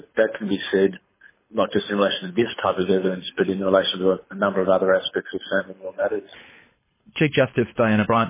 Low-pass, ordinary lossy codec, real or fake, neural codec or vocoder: 3.6 kHz; MP3, 16 kbps; fake; codec, 24 kHz, 6 kbps, HILCodec